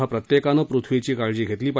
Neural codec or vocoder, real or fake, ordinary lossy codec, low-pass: none; real; none; none